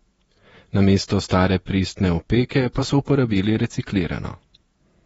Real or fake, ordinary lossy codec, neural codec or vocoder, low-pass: fake; AAC, 24 kbps; vocoder, 48 kHz, 128 mel bands, Vocos; 19.8 kHz